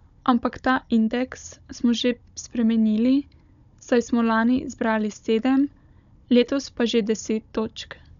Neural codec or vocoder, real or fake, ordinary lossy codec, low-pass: codec, 16 kHz, 16 kbps, FunCodec, trained on Chinese and English, 50 frames a second; fake; none; 7.2 kHz